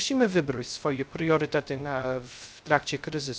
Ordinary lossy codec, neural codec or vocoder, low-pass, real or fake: none; codec, 16 kHz, 0.3 kbps, FocalCodec; none; fake